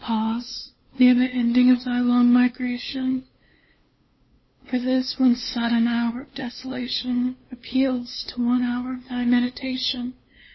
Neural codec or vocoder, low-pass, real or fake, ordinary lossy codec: codec, 16 kHz, 2 kbps, FunCodec, trained on LibriTTS, 25 frames a second; 7.2 kHz; fake; MP3, 24 kbps